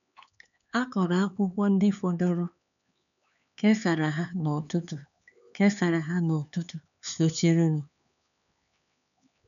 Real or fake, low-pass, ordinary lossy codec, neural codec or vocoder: fake; 7.2 kHz; none; codec, 16 kHz, 4 kbps, X-Codec, HuBERT features, trained on LibriSpeech